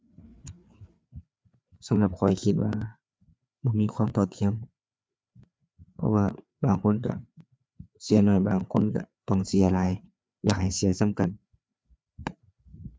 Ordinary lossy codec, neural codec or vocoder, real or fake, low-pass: none; codec, 16 kHz, 4 kbps, FreqCodec, larger model; fake; none